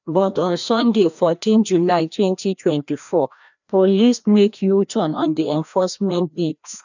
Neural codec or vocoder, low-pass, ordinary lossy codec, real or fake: codec, 16 kHz, 1 kbps, FreqCodec, larger model; 7.2 kHz; none; fake